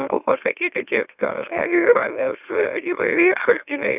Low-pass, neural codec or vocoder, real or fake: 3.6 kHz; autoencoder, 44.1 kHz, a latent of 192 numbers a frame, MeloTTS; fake